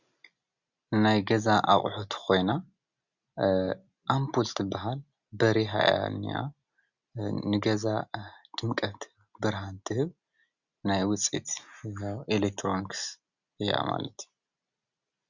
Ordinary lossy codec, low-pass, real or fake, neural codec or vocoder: Opus, 64 kbps; 7.2 kHz; real; none